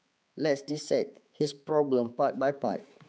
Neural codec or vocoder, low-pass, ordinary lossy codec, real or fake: codec, 16 kHz, 4 kbps, X-Codec, HuBERT features, trained on balanced general audio; none; none; fake